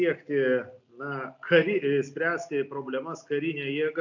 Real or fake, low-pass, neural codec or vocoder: real; 7.2 kHz; none